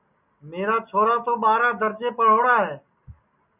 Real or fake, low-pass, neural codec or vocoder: real; 3.6 kHz; none